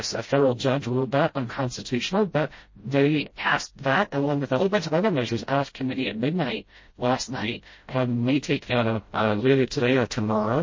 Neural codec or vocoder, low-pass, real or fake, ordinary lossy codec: codec, 16 kHz, 0.5 kbps, FreqCodec, smaller model; 7.2 kHz; fake; MP3, 32 kbps